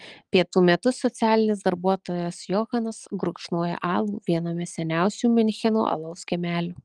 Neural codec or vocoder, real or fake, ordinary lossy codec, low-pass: none; real; Opus, 24 kbps; 10.8 kHz